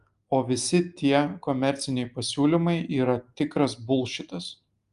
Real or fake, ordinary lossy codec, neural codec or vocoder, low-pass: real; Opus, 32 kbps; none; 10.8 kHz